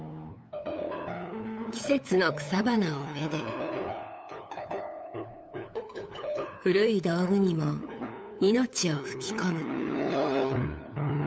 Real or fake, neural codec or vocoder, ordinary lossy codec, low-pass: fake; codec, 16 kHz, 8 kbps, FunCodec, trained on LibriTTS, 25 frames a second; none; none